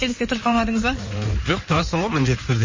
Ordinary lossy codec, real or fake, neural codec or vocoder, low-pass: MP3, 32 kbps; fake; codec, 16 kHz in and 24 kHz out, 2.2 kbps, FireRedTTS-2 codec; 7.2 kHz